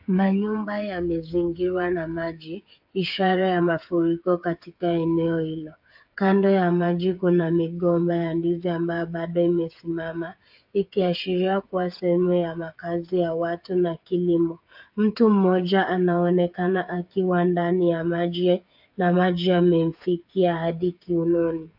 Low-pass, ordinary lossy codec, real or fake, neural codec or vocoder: 5.4 kHz; AAC, 48 kbps; fake; codec, 16 kHz, 8 kbps, FreqCodec, smaller model